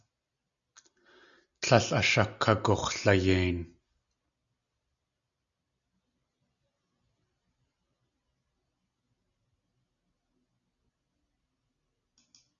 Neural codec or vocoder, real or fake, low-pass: none; real; 7.2 kHz